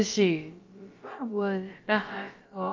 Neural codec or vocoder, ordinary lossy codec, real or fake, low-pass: codec, 16 kHz, about 1 kbps, DyCAST, with the encoder's durations; Opus, 32 kbps; fake; 7.2 kHz